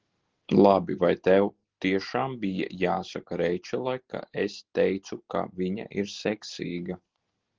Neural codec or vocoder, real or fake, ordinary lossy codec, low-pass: none; real; Opus, 16 kbps; 7.2 kHz